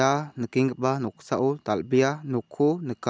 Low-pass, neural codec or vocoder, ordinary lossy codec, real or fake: none; none; none; real